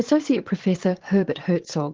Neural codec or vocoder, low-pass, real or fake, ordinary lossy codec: vocoder, 22.05 kHz, 80 mel bands, Vocos; 7.2 kHz; fake; Opus, 16 kbps